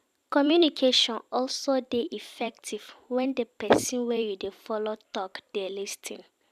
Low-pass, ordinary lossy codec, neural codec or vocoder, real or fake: 14.4 kHz; none; vocoder, 44.1 kHz, 128 mel bands every 256 samples, BigVGAN v2; fake